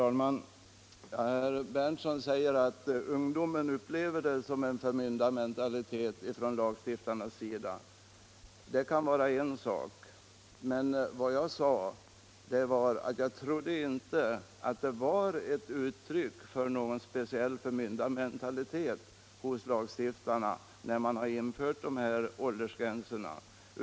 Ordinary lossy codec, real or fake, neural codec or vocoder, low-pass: none; real; none; none